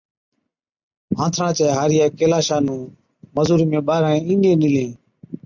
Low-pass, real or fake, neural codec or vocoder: 7.2 kHz; real; none